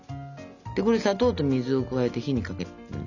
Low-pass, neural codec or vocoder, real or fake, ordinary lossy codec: 7.2 kHz; none; real; none